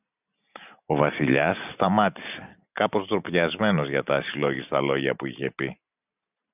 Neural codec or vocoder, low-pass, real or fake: none; 3.6 kHz; real